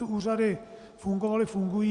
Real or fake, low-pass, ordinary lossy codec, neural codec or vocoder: real; 10.8 kHz; Opus, 64 kbps; none